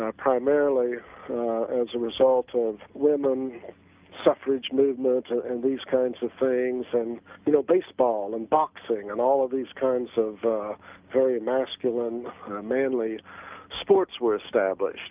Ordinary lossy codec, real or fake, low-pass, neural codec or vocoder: Opus, 24 kbps; real; 3.6 kHz; none